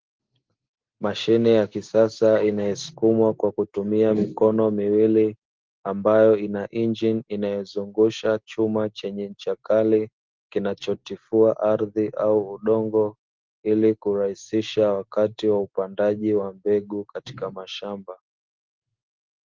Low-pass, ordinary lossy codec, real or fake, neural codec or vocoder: 7.2 kHz; Opus, 16 kbps; real; none